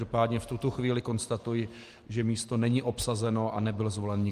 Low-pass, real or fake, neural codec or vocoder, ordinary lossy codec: 14.4 kHz; real; none; Opus, 24 kbps